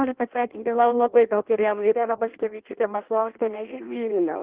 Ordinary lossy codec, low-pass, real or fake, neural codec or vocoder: Opus, 16 kbps; 3.6 kHz; fake; codec, 16 kHz in and 24 kHz out, 0.6 kbps, FireRedTTS-2 codec